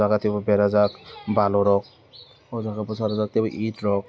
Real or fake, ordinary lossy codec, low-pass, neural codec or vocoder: real; none; none; none